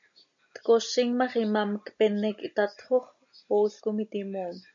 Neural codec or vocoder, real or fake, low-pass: none; real; 7.2 kHz